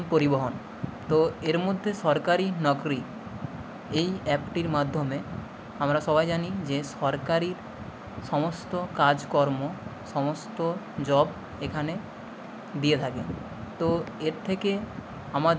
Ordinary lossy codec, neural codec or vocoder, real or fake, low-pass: none; none; real; none